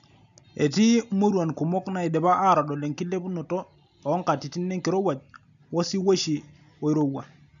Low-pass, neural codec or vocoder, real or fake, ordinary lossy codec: 7.2 kHz; none; real; none